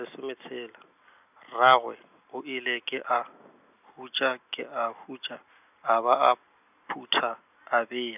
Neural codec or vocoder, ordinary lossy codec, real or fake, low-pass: none; none; real; 3.6 kHz